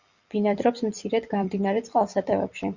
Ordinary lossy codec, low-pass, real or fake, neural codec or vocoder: Opus, 64 kbps; 7.2 kHz; fake; vocoder, 44.1 kHz, 128 mel bands every 256 samples, BigVGAN v2